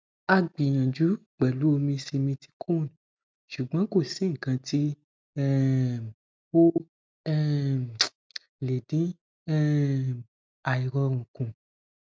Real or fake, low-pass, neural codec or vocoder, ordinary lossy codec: real; none; none; none